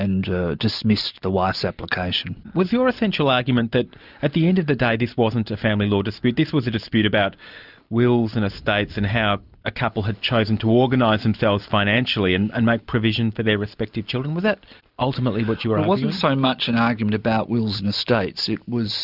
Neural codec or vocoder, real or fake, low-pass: none; real; 5.4 kHz